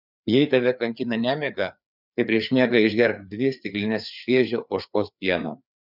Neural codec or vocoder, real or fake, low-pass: codec, 16 kHz, 4 kbps, FreqCodec, larger model; fake; 5.4 kHz